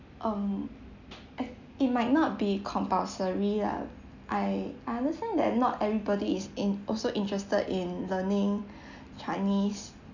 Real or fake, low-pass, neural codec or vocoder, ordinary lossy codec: real; 7.2 kHz; none; none